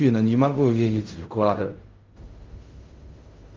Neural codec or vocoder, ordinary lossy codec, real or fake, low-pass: codec, 16 kHz in and 24 kHz out, 0.4 kbps, LongCat-Audio-Codec, fine tuned four codebook decoder; Opus, 32 kbps; fake; 7.2 kHz